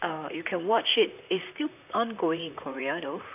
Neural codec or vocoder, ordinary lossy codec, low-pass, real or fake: vocoder, 44.1 kHz, 128 mel bands, Pupu-Vocoder; none; 3.6 kHz; fake